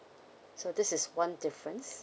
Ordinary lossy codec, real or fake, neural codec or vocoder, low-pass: none; real; none; none